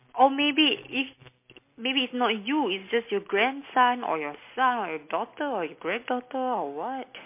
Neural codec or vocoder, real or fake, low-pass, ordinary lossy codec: none; real; 3.6 kHz; MP3, 24 kbps